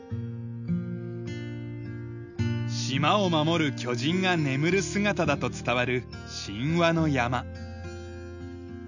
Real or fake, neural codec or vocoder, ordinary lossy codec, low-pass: real; none; none; 7.2 kHz